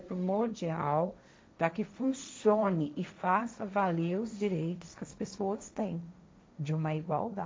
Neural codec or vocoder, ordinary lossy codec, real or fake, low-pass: codec, 16 kHz, 1.1 kbps, Voila-Tokenizer; none; fake; none